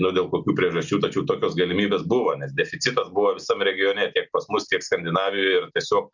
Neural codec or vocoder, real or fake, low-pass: none; real; 7.2 kHz